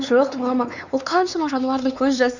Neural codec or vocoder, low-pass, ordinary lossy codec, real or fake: codec, 16 kHz, 4 kbps, X-Codec, WavLM features, trained on Multilingual LibriSpeech; 7.2 kHz; none; fake